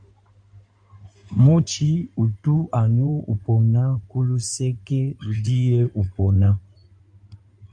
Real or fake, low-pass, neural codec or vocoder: fake; 9.9 kHz; codec, 16 kHz in and 24 kHz out, 2.2 kbps, FireRedTTS-2 codec